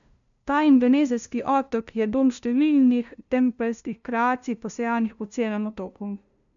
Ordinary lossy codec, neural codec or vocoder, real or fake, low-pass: none; codec, 16 kHz, 0.5 kbps, FunCodec, trained on LibriTTS, 25 frames a second; fake; 7.2 kHz